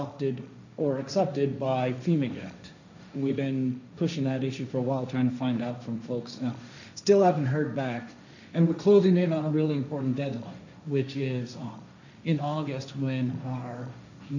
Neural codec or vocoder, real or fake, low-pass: codec, 16 kHz, 1.1 kbps, Voila-Tokenizer; fake; 7.2 kHz